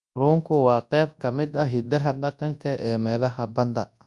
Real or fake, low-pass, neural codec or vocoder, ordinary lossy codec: fake; 10.8 kHz; codec, 24 kHz, 0.9 kbps, WavTokenizer, large speech release; Opus, 64 kbps